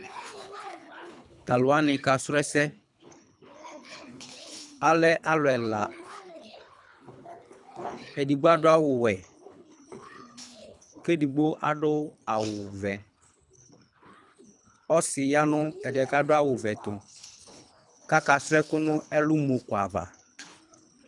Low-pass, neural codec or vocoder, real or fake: 10.8 kHz; codec, 24 kHz, 3 kbps, HILCodec; fake